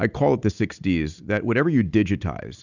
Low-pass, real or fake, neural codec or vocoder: 7.2 kHz; real; none